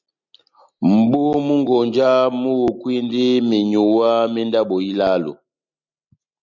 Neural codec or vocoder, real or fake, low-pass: none; real; 7.2 kHz